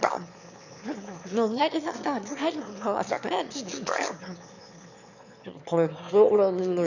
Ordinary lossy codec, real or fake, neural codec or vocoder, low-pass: none; fake; autoencoder, 22.05 kHz, a latent of 192 numbers a frame, VITS, trained on one speaker; 7.2 kHz